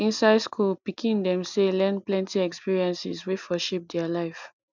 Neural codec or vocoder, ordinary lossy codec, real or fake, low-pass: none; none; real; 7.2 kHz